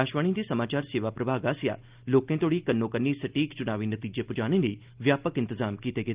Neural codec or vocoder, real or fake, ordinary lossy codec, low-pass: none; real; Opus, 32 kbps; 3.6 kHz